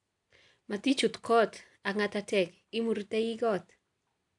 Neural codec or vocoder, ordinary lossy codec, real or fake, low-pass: none; none; real; 10.8 kHz